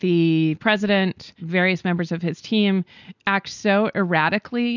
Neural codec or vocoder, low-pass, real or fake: none; 7.2 kHz; real